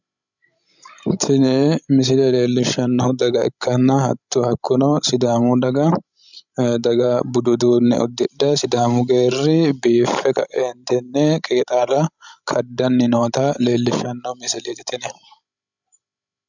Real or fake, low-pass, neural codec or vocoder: fake; 7.2 kHz; codec, 16 kHz, 16 kbps, FreqCodec, larger model